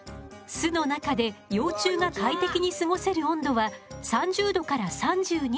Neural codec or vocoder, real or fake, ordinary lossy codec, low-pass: none; real; none; none